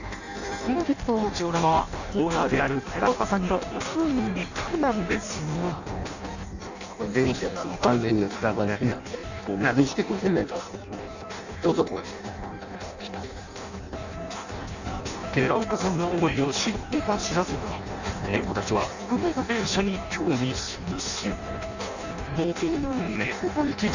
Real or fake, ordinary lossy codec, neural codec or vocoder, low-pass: fake; none; codec, 16 kHz in and 24 kHz out, 0.6 kbps, FireRedTTS-2 codec; 7.2 kHz